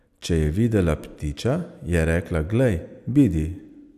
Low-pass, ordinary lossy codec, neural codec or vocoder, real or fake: 14.4 kHz; none; none; real